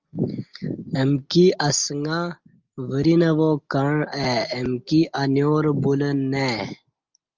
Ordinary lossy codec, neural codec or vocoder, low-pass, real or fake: Opus, 24 kbps; none; 7.2 kHz; real